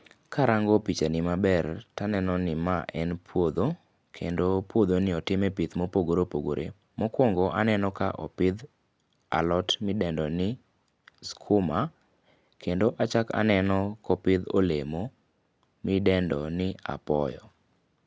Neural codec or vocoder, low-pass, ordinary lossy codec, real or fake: none; none; none; real